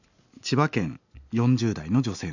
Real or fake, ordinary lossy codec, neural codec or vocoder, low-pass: real; none; none; 7.2 kHz